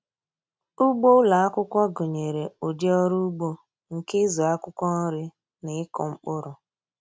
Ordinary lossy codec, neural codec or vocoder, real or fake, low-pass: none; none; real; none